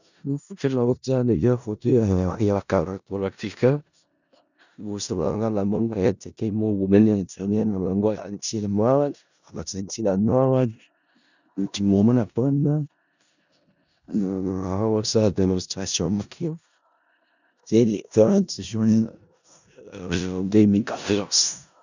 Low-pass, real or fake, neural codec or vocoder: 7.2 kHz; fake; codec, 16 kHz in and 24 kHz out, 0.4 kbps, LongCat-Audio-Codec, four codebook decoder